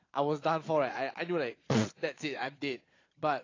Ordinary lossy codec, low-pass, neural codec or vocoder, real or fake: AAC, 32 kbps; 7.2 kHz; vocoder, 22.05 kHz, 80 mel bands, Vocos; fake